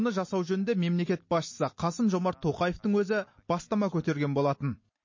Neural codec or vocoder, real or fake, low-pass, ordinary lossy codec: none; real; 7.2 kHz; MP3, 32 kbps